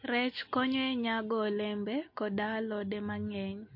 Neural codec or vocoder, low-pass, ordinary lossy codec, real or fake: none; 5.4 kHz; MP3, 32 kbps; real